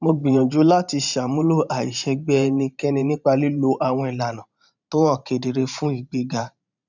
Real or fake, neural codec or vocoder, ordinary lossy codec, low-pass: fake; vocoder, 44.1 kHz, 128 mel bands every 512 samples, BigVGAN v2; none; 7.2 kHz